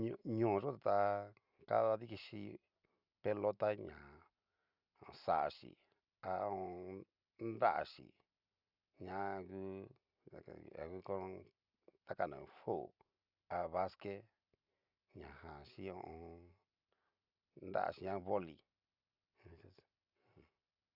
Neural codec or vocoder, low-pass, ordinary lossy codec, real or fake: none; 5.4 kHz; Opus, 64 kbps; real